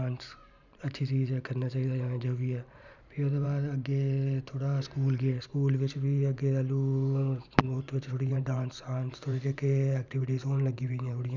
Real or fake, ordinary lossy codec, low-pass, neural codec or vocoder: fake; none; 7.2 kHz; vocoder, 44.1 kHz, 128 mel bands every 512 samples, BigVGAN v2